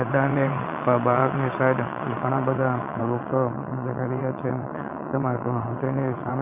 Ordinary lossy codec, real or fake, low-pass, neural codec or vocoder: none; fake; 3.6 kHz; vocoder, 22.05 kHz, 80 mel bands, WaveNeXt